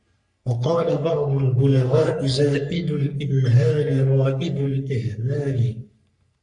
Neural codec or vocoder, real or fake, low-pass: codec, 44.1 kHz, 3.4 kbps, Pupu-Codec; fake; 10.8 kHz